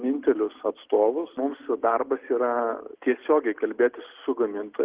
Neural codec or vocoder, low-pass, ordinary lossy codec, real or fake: none; 3.6 kHz; Opus, 16 kbps; real